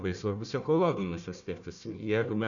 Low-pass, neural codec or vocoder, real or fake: 7.2 kHz; codec, 16 kHz, 1 kbps, FunCodec, trained on Chinese and English, 50 frames a second; fake